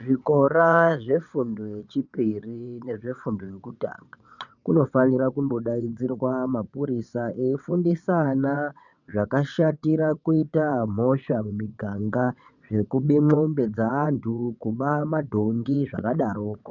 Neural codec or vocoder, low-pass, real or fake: vocoder, 22.05 kHz, 80 mel bands, WaveNeXt; 7.2 kHz; fake